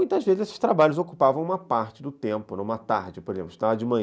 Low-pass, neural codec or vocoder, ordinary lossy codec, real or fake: none; none; none; real